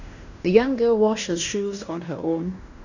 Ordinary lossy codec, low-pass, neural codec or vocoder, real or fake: none; 7.2 kHz; codec, 16 kHz in and 24 kHz out, 0.9 kbps, LongCat-Audio-Codec, fine tuned four codebook decoder; fake